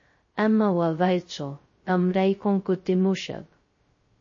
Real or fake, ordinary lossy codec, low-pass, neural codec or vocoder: fake; MP3, 32 kbps; 7.2 kHz; codec, 16 kHz, 0.2 kbps, FocalCodec